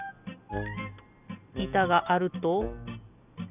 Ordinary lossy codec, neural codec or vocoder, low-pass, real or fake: none; none; 3.6 kHz; real